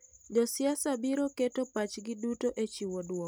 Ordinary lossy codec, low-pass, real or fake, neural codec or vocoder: none; none; real; none